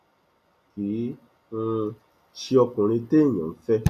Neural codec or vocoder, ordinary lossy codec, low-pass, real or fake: none; none; 14.4 kHz; real